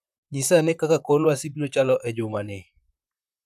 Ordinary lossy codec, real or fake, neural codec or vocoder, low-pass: none; fake; vocoder, 44.1 kHz, 128 mel bands, Pupu-Vocoder; 14.4 kHz